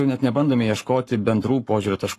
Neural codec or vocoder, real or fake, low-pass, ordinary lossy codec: codec, 44.1 kHz, 7.8 kbps, Pupu-Codec; fake; 14.4 kHz; AAC, 48 kbps